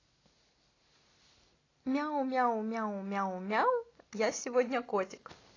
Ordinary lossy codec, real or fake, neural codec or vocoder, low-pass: AAC, 32 kbps; real; none; 7.2 kHz